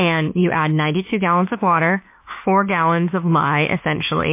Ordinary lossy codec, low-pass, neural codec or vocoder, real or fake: MP3, 24 kbps; 3.6 kHz; autoencoder, 48 kHz, 32 numbers a frame, DAC-VAE, trained on Japanese speech; fake